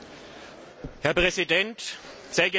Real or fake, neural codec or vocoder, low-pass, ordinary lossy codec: real; none; none; none